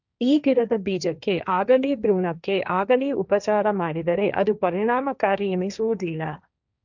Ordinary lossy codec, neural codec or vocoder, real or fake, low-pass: none; codec, 16 kHz, 1.1 kbps, Voila-Tokenizer; fake; 7.2 kHz